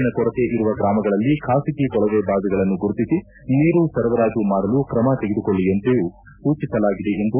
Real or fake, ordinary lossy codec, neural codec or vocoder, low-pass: real; none; none; 3.6 kHz